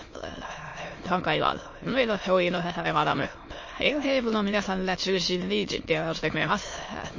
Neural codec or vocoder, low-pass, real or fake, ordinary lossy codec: autoencoder, 22.05 kHz, a latent of 192 numbers a frame, VITS, trained on many speakers; 7.2 kHz; fake; MP3, 32 kbps